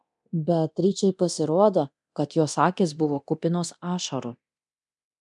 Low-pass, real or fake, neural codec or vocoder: 10.8 kHz; fake; codec, 24 kHz, 0.9 kbps, DualCodec